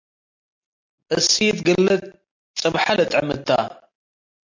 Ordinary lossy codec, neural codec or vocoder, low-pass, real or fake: MP3, 48 kbps; none; 7.2 kHz; real